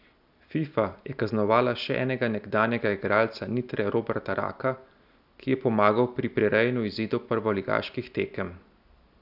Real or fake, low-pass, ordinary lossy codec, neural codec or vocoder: real; 5.4 kHz; none; none